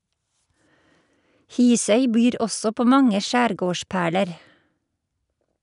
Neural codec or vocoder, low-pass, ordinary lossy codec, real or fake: none; 10.8 kHz; none; real